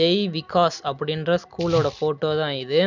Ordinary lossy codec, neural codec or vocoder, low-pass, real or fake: none; none; 7.2 kHz; real